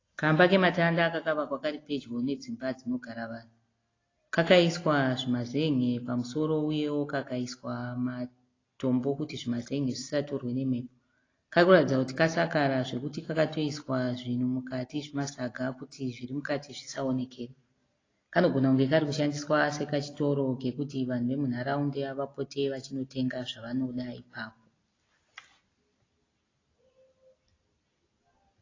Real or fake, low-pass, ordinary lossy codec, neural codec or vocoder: real; 7.2 kHz; AAC, 32 kbps; none